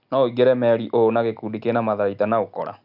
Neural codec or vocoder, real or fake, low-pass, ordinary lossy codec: none; real; 5.4 kHz; none